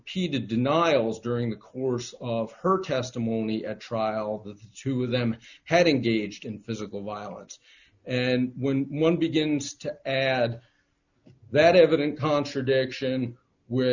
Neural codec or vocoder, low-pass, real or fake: none; 7.2 kHz; real